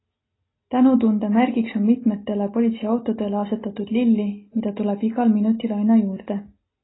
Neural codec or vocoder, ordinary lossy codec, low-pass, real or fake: none; AAC, 16 kbps; 7.2 kHz; real